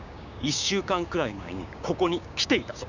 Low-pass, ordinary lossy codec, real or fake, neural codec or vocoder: 7.2 kHz; none; fake; codec, 16 kHz, 6 kbps, DAC